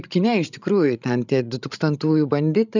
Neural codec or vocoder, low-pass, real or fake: codec, 16 kHz, 16 kbps, FreqCodec, larger model; 7.2 kHz; fake